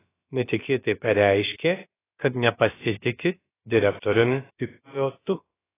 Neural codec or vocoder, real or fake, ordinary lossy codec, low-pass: codec, 16 kHz, about 1 kbps, DyCAST, with the encoder's durations; fake; AAC, 16 kbps; 3.6 kHz